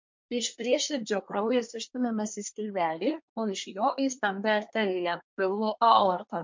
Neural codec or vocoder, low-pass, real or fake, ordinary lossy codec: codec, 24 kHz, 1 kbps, SNAC; 7.2 kHz; fake; MP3, 48 kbps